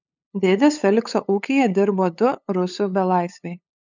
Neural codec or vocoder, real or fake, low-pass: codec, 16 kHz, 8 kbps, FunCodec, trained on LibriTTS, 25 frames a second; fake; 7.2 kHz